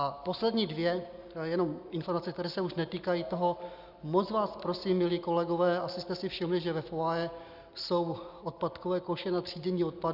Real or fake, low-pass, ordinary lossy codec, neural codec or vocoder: real; 5.4 kHz; Opus, 64 kbps; none